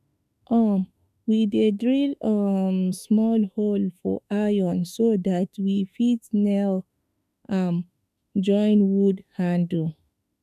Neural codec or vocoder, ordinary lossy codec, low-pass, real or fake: autoencoder, 48 kHz, 32 numbers a frame, DAC-VAE, trained on Japanese speech; none; 14.4 kHz; fake